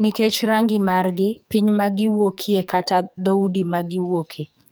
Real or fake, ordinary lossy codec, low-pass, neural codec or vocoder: fake; none; none; codec, 44.1 kHz, 2.6 kbps, SNAC